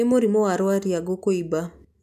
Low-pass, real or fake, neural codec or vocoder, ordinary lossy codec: 14.4 kHz; real; none; none